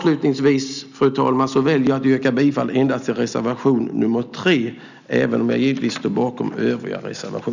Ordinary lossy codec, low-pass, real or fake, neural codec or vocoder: none; 7.2 kHz; real; none